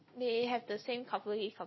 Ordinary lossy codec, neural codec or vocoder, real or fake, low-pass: MP3, 24 kbps; none; real; 7.2 kHz